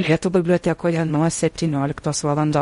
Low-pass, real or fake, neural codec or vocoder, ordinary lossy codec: 10.8 kHz; fake; codec, 16 kHz in and 24 kHz out, 0.6 kbps, FocalCodec, streaming, 4096 codes; MP3, 48 kbps